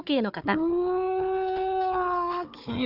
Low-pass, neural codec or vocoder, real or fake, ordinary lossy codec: 5.4 kHz; codec, 16 kHz, 4 kbps, FunCodec, trained on Chinese and English, 50 frames a second; fake; none